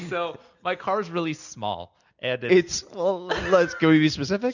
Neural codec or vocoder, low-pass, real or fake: none; 7.2 kHz; real